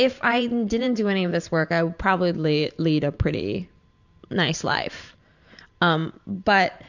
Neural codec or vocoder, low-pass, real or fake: vocoder, 44.1 kHz, 128 mel bands every 512 samples, BigVGAN v2; 7.2 kHz; fake